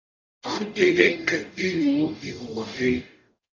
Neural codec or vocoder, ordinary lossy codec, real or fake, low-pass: codec, 44.1 kHz, 0.9 kbps, DAC; AAC, 48 kbps; fake; 7.2 kHz